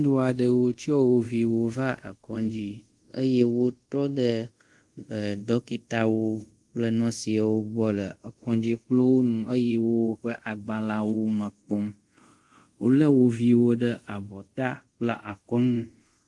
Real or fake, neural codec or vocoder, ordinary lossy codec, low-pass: fake; codec, 24 kHz, 0.5 kbps, DualCodec; Opus, 24 kbps; 10.8 kHz